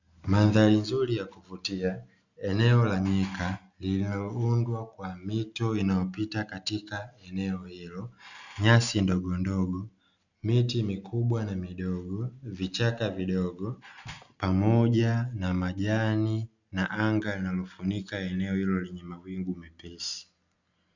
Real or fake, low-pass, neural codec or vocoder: real; 7.2 kHz; none